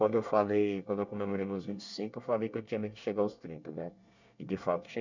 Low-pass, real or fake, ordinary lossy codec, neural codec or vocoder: 7.2 kHz; fake; none; codec, 24 kHz, 1 kbps, SNAC